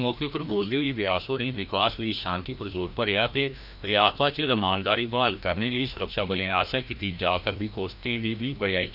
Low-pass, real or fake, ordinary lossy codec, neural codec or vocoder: 5.4 kHz; fake; none; codec, 16 kHz, 1 kbps, FreqCodec, larger model